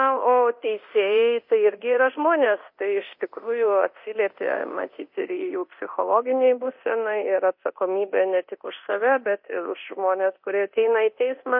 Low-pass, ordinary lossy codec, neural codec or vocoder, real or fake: 5.4 kHz; MP3, 32 kbps; codec, 24 kHz, 0.9 kbps, DualCodec; fake